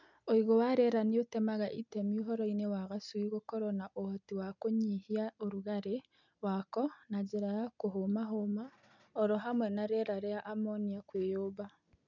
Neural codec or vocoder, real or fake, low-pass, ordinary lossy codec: none; real; 7.2 kHz; none